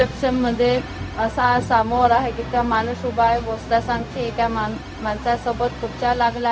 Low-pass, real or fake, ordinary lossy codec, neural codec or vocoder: none; fake; none; codec, 16 kHz, 0.4 kbps, LongCat-Audio-Codec